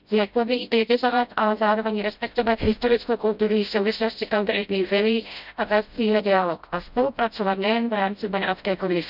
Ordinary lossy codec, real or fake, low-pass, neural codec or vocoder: none; fake; 5.4 kHz; codec, 16 kHz, 0.5 kbps, FreqCodec, smaller model